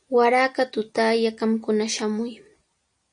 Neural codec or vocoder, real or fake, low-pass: none; real; 9.9 kHz